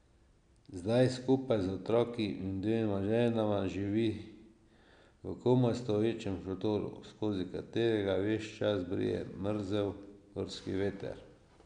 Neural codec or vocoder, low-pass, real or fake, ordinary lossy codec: none; 9.9 kHz; real; none